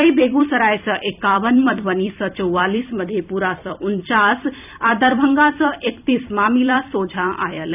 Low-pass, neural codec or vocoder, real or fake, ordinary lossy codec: 3.6 kHz; vocoder, 44.1 kHz, 128 mel bands every 256 samples, BigVGAN v2; fake; none